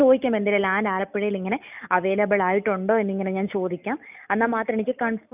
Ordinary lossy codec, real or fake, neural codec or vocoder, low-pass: none; real; none; 3.6 kHz